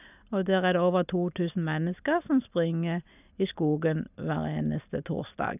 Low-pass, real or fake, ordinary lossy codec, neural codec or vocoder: 3.6 kHz; real; none; none